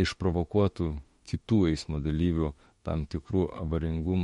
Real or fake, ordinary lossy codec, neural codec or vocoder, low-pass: fake; MP3, 48 kbps; autoencoder, 48 kHz, 32 numbers a frame, DAC-VAE, trained on Japanese speech; 19.8 kHz